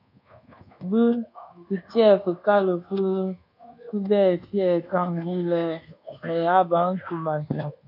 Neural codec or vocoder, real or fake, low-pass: codec, 24 kHz, 1.2 kbps, DualCodec; fake; 5.4 kHz